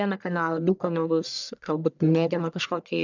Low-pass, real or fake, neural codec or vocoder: 7.2 kHz; fake; codec, 44.1 kHz, 1.7 kbps, Pupu-Codec